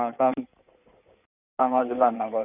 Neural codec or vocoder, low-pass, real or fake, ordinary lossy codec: codec, 16 kHz in and 24 kHz out, 2.2 kbps, FireRedTTS-2 codec; 3.6 kHz; fake; none